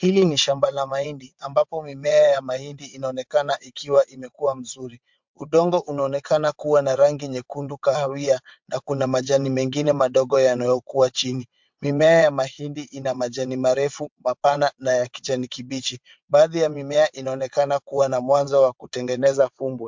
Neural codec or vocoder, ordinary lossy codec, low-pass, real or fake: vocoder, 22.05 kHz, 80 mel bands, WaveNeXt; MP3, 64 kbps; 7.2 kHz; fake